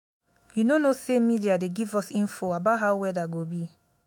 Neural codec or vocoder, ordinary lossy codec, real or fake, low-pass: autoencoder, 48 kHz, 128 numbers a frame, DAC-VAE, trained on Japanese speech; MP3, 96 kbps; fake; 19.8 kHz